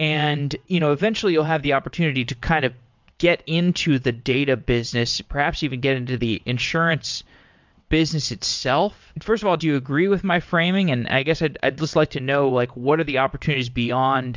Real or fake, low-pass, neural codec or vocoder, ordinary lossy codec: fake; 7.2 kHz; vocoder, 22.05 kHz, 80 mel bands, WaveNeXt; MP3, 64 kbps